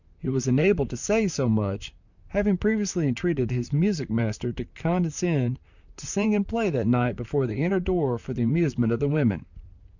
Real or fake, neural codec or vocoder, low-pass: fake; vocoder, 44.1 kHz, 128 mel bands, Pupu-Vocoder; 7.2 kHz